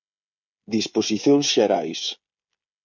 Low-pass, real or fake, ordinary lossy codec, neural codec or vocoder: 7.2 kHz; fake; MP3, 64 kbps; codec, 16 kHz, 16 kbps, FreqCodec, smaller model